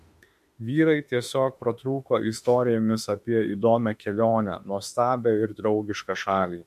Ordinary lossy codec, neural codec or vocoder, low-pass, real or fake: MP3, 64 kbps; autoencoder, 48 kHz, 32 numbers a frame, DAC-VAE, trained on Japanese speech; 14.4 kHz; fake